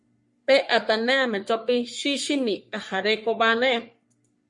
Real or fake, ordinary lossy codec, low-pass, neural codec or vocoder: fake; MP3, 48 kbps; 10.8 kHz; codec, 44.1 kHz, 3.4 kbps, Pupu-Codec